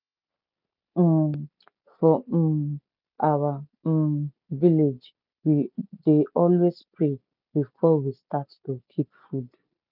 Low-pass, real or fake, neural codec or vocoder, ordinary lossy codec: 5.4 kHz; real; none; none